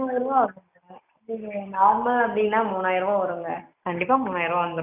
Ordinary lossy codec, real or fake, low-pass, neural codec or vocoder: none; real; 3.6 kHz; none